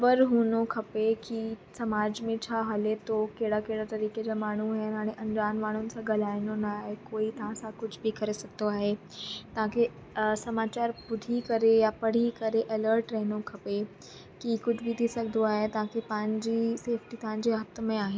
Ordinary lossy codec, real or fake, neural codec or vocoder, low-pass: none; real; none; none